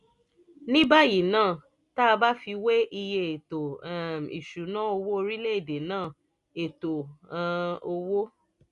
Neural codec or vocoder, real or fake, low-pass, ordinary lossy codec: none; real; 10.8 kHz; none